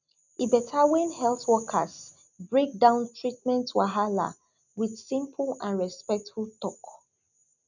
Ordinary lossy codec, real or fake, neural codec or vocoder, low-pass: none; real; none; 7.2 kHz